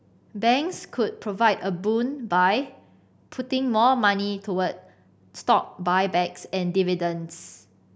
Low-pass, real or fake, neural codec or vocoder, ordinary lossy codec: none; real; none; none